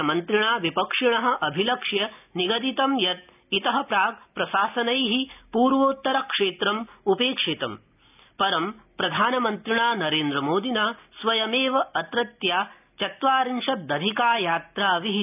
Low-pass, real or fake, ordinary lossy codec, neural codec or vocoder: 3.6 kHz; real; none; none